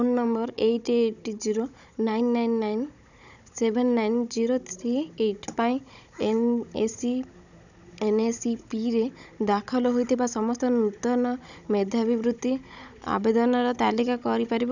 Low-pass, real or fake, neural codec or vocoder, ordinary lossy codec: 7.2 kHz; fake; codec, 16 kHz, 16 kbps, FunCodec, trained on Chinese and English, 50 frames a second; none